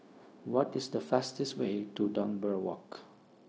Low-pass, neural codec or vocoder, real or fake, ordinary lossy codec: none; codec, 16 kHz, 0.4 kbps, LongCat-Audio-Codec; fake; none